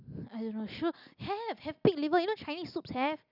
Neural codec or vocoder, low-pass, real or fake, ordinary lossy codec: none; 5.4 kHz; real; none